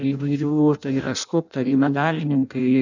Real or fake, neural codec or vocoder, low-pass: fake; codec, 16 kHz in and 24 kHz out, 0.6 kbps, FireRedTTS-2 codec; 7.2 kHz